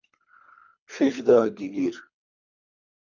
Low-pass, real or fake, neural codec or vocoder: 7.2 kHz; fake; codec, 24 kHz, 3 kbps, HILCodec